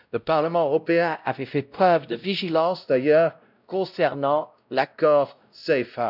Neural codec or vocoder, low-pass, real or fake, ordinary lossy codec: codec, 16 kHz, 0.5 kbps, X-Codec, WavLM features, trained on Multilingual LibriSpeech; 5.4 kHz; fake; none